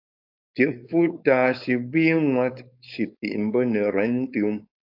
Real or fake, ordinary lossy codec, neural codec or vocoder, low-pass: fake; AAC, 48 kbps; codec, 16 kHz, 4.8 kbps, FACodec; 5.4 kHz